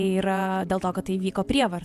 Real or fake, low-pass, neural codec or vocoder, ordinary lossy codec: fake; 14.4 kHz; vocoder, 48 kHz, 128 mel bands, Vocos; Opus, 64 kbps